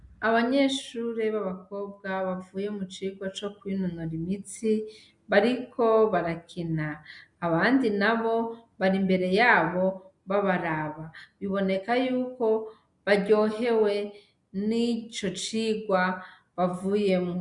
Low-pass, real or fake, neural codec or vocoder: 10.8 kHz; real; none